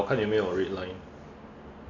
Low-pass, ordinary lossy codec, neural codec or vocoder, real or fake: 7.2 kHz; none; none; real